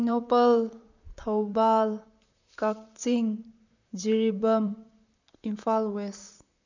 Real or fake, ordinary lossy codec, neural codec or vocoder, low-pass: fake; none; vocoder, 44.1 kHz, 128 mel bands, Pupu-Vocoder; 7.2 kHz